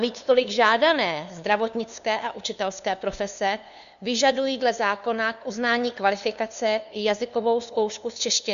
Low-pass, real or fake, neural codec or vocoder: 7.2 kHz; fake; codec, 16 kHz, 2 kbps, FunCodec, trained on LibriTTS, 25 frames a second